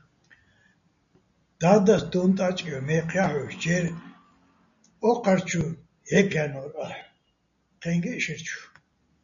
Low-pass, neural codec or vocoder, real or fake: 7.2 kHz; none; real